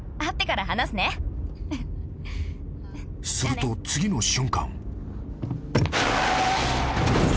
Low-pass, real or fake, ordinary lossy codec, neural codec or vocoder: none; real; none; none